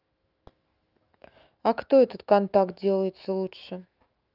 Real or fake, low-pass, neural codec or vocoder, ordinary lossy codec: fake; 5.4 kHz; autoencoder, 48 kHz, 128 numbers a frame, DAC-VAE, trained on Japanese speech; Opus, 24 kbps